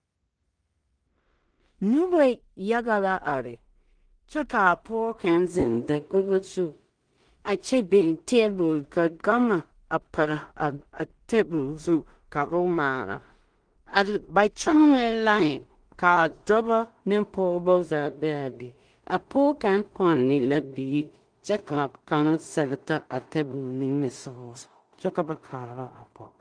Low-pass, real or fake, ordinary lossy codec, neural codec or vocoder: 9.9 kHz; fake; Opus, 24 kbps; codec, 16 kHz in and 24 kHz out, 0.4 kbps, LongCat-Audio-Codec, two codebook decoder